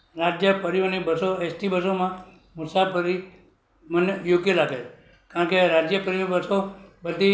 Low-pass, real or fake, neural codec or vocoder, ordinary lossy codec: none; real; none; none